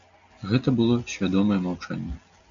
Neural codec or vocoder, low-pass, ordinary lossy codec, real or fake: none; 7.2 kHz; MP3, 64 kbps; real